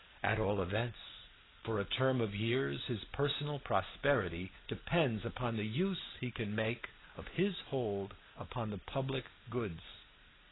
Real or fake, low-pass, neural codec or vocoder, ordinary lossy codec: real; 7.2 kHz; none; AAC, 16 kbps